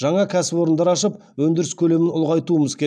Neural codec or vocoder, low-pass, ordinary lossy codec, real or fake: none; none; none; real